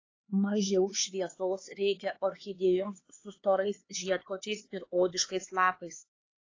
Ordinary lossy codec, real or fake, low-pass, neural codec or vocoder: AAC, 32 kbps; fake; 7.2 kHz; codec, 16 kHz, 4 kbps, X-Codec, HuBERT features, trained on LibriSpeech